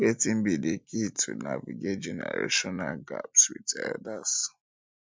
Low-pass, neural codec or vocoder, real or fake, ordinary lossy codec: none; none; real; none